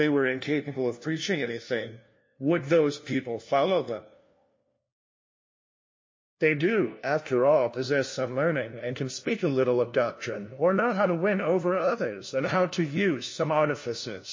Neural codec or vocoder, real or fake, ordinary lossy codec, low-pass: codec, 16 kHz, 1 kbps, FunCodec, trained on LibriTTS, 50 frames a second; fake; MP3, 32 kbps; 7.2 kHz